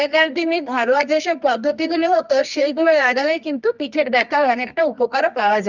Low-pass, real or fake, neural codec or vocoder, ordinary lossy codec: 7.2 kHz; fake; codec, 24 kHz, 0.9 kbps, WavTokenizer, medium music audio release; none